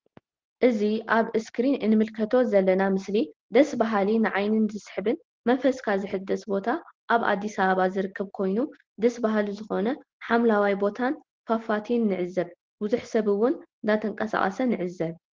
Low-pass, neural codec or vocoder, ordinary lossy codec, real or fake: 7.2 kHz; none; Opus, 16 kbps; real